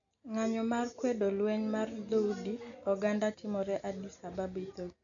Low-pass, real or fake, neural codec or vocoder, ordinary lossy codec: 7.2 kHz; real; none; none